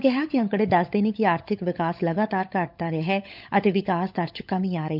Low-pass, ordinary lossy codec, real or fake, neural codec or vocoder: 5.4 kHz; none; fake; codec, 16 kHz, 16 kbps, FunCodec, trained on LibriTTS, 50 frames a second